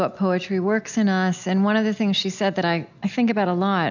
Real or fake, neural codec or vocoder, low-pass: real; none; 7.2 kHz